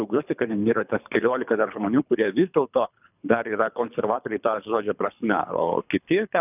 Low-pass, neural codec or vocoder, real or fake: 3.6 kHz; codec, 24 kHz, 3 kbps, HILCodec; fake